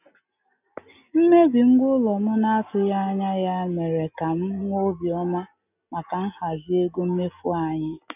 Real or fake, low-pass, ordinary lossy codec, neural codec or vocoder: real; 3.6 kHz; none; none